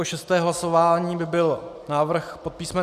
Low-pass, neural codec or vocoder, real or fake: 14.4 kHz; none; real